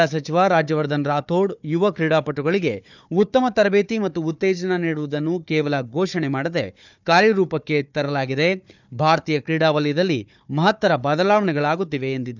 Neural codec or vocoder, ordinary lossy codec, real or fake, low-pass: codec, 16 kHz, 4 kbps, FunCodec, trained on Chinese and English, 50 frames a second; none; fake; 7.2 kHz